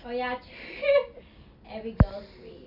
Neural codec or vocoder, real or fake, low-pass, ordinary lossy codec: none; real; 5.4 kHz; none